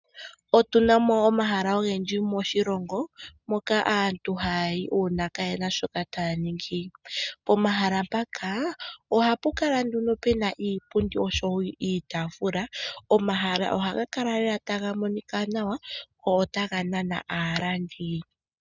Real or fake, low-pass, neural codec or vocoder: real; 7.2 kHz; none